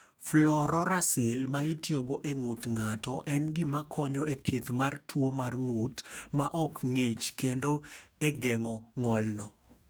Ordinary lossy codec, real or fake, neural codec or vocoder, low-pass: none; fake; codec, 44.1 kHz, 2.6 kbps, DAC; none